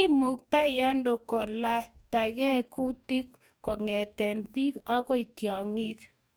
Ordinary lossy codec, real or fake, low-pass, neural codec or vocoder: none; fake; none; codec, 44.1 kHz, 2.6 kbps, DAC